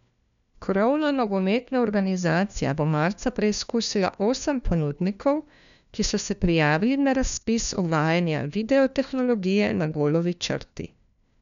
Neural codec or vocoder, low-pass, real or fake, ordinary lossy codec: codec, 16 kHz, 1 kbps, FunCodec, trained on LibriTTS, 50 frames a second; 7.2 kHz; fake; none